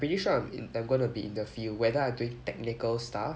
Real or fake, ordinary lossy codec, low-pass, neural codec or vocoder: real; none; none; none